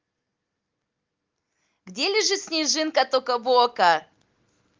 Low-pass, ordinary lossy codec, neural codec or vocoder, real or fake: 7.2 kHz; Opus, 24 kbps; none; real